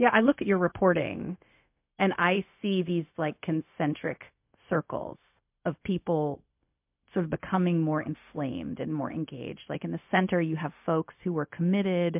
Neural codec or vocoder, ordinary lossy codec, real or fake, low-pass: codec, 16 kHz, 0.4 kbps, LongCat-Audio-Codec; MP3, 32 kbps; fake; 3.6 kHz